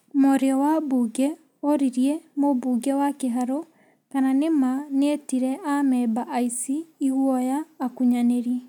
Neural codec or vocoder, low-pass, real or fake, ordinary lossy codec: none; 19.8 kHz; real; none